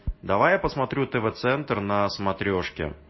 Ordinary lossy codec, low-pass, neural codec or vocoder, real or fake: MP3, 24 kbps; 7.2 kHz; none; real